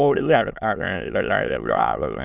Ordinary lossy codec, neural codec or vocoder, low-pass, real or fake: none; autoencoder, 22.05 kHz, a latent of 192 numbers a frame, VITS, trained on many speakers; 3.6 kHz; fake